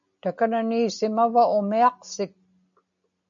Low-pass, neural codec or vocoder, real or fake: 7.2 kHz; none; real